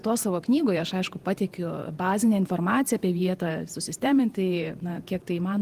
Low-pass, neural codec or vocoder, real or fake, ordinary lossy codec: 14.4 kHz; vocoder, 48 kHz, 128 mel bands, Vocos; fake; Opus, 24 kbps